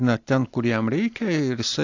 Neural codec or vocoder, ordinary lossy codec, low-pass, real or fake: none; AAC, 48 kbps; 7.2 kHz; real